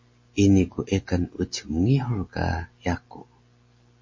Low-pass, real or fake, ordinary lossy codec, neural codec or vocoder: 7.2 kHz; real; MP3, 32 kbps; none